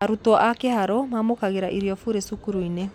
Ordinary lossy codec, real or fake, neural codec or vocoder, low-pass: none; real; none; 19.8 kHz